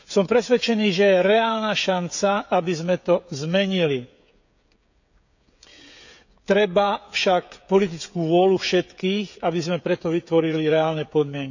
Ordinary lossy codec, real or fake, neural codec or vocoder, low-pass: none; fake; codec, 16 kHz, 16 kbps, FreqCodec, smaller model; 7.2 kHz